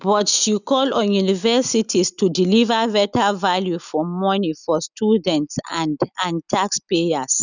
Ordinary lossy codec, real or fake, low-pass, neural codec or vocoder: none; real; 7.2 kHz; none